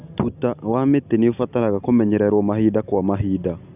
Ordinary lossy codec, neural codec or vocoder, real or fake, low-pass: none; none; real; 3.6 kHz